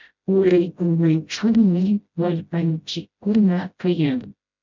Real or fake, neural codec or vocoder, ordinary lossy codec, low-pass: fake; codec, 16 kHz, 0.5 kbps, FreqCodec, smaller model; MP3, 64 kbps; 7.2 kHz